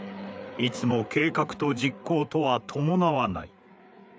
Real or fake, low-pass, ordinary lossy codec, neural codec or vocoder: fake; none; none; codec, 16 kHz, 4 kbps, FreqCodec, larger model